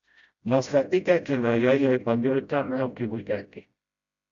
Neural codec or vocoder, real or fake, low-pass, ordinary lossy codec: codec, 16 kHz, 0.5 kbps, FreqCodec, smaller model; fake; 7.2 kHz; Opus, 64 kbps